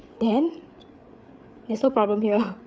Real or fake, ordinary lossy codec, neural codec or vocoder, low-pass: fake; none; codec, 16 kHz, 8 kbps, FreqCodec, larger model; none